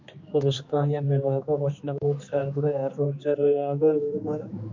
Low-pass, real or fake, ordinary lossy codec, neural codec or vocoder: 7.2 kHz; fake; MP3, 48 kbps; codec, 16 kHz, 2 kbps, X-Codec, HuBERT features, trained on general audio